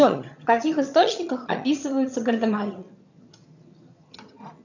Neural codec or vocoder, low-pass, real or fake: vocoder, 22.05 kHz, 80 mel bands, HiFi-GAN; 7.2 kHz; fake